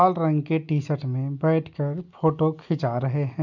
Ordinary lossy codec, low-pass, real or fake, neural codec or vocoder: none; 7.2 kHz; real; none